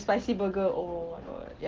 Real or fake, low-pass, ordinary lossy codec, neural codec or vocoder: real; 7.2 kHz; Opus, 16 kbps; none